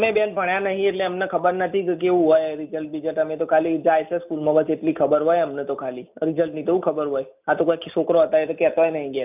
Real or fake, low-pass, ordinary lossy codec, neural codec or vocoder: real; 3.6 kHz; none; none